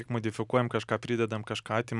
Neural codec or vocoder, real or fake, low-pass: none; real; 10.8 kHz